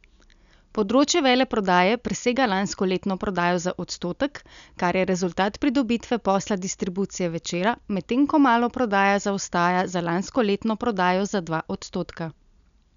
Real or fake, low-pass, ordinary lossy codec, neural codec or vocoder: real; 7.2 kHz; none; none